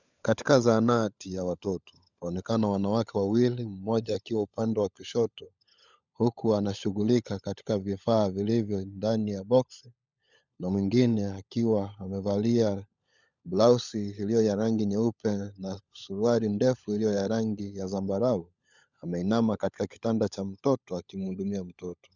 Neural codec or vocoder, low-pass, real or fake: codec, 16 kHz, 16 kbps, FunCodec, trained on LibriTTS, 50 frames a second; 7.2 kHz; fake